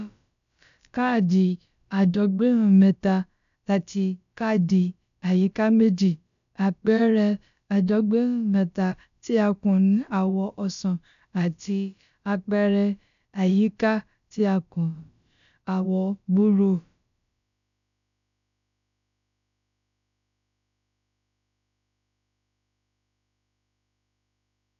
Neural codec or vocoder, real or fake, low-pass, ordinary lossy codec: codec, 16 kHz, about 1 kbps, DyCAST, with the encoder's durations; fake; 7.2 kHz; none